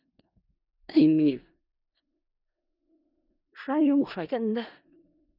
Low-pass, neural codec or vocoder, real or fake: 5.4 kHz; codec, 16 kHz in and 24 kHz out, 0.4 kbps, LongCat-Audio-Codec, four codebook decoder; fake